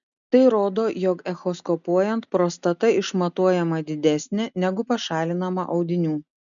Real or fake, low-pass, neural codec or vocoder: real; 7.2 kHz; none